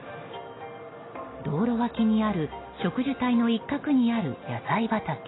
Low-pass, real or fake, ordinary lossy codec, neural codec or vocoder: 7.2 kHz; real; AAC, 16 kbps; none